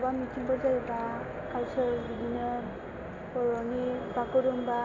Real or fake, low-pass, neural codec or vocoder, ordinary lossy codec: real; 7.2 kHz; none; AAC, 32 kbps